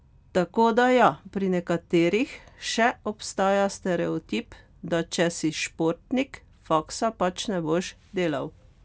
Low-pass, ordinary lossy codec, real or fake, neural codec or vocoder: none; none; real; none